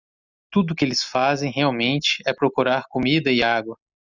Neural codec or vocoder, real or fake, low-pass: none; real; 7.2 kHz